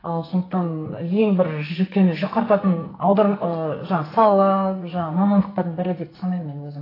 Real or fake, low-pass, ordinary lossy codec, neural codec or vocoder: fake; 5.4 kHz; AAC, 24 kbps; codec, 44.1 kHz, 2.6 kbps, SNAC